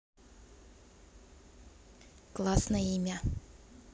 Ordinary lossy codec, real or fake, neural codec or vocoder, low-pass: none; real; none; none